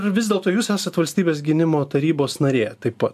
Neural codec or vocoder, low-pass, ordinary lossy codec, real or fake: none; 14.4 kHz; AAC, 96 kbps; real